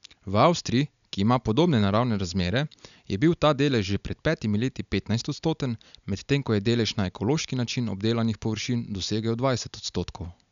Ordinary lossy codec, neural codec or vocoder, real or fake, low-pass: MP3, 96 kbps; none; real; 7.2 kHz